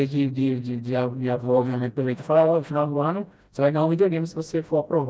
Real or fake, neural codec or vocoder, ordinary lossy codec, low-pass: fake; codec, 16 kHz, 1 kbps, FreqCodec, smaller model; none; none